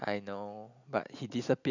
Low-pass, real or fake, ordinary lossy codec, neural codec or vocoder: 7.2 kHz; real; none; none